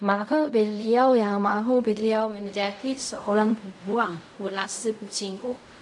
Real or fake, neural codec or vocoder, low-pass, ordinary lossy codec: fake; codec, 16 kHz in and 24 kHz out, 0.4 kbps, LongCat-Audio-Codec, fine tuned four codebook decoder; 10.8 kHz; MP3, 64 kbps